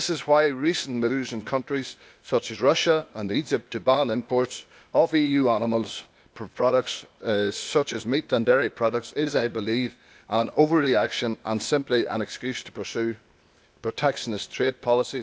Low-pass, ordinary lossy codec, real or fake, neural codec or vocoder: none; none; fake; codec, 16 kHz, 0.8 kbps, ZipCodec